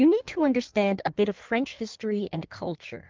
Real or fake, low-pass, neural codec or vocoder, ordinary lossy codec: fake; 7.2 kHz; codec, 16 kHz in and 24 kHz out, 1.1 kbps, FireRedTTS-2 codec; Opus, 32 kbps